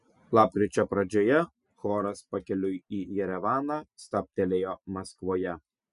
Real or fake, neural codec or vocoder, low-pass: real; none; 10.8 kHz